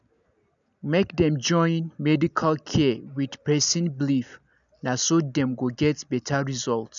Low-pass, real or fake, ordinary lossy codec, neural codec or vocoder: 7.2 kHz; real; none; none